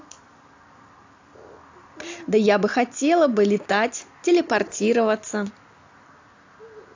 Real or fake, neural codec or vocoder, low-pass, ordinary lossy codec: real; none; 7.2 kHz; AAC, 48 kbps